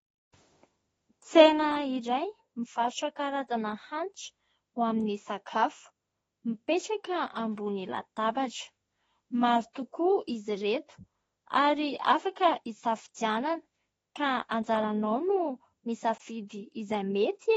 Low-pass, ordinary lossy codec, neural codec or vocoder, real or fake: 19.8 kHz; AAC, 24 kbps; autoencoder, 48 kHz, 32 numbers a frame, DAC-VAE, trained on Japanese speech; fake